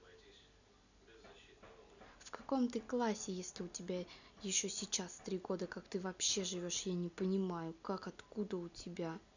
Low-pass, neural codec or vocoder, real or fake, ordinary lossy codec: 7.2 kHz; none; real; none